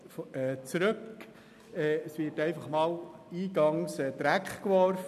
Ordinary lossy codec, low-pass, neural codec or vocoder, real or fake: none; 14.4 kHz; none; real